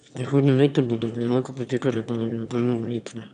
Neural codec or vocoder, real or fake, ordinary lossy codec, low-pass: autoencoder, 22.05 kHz, a latent of 192 numbers a frame, VITS, trained on one speaker; fake; none; 9.9 kHz